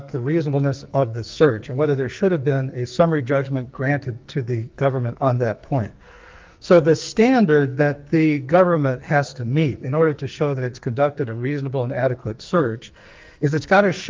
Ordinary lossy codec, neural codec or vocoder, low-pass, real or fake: Opus, 24 kbps; codec, 44.1 kHz, 2.6 kbps, SNAC; 7.2 kHz; fake